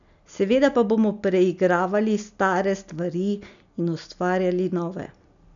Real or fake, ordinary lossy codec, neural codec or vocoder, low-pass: real; none; none; 7.2 kHz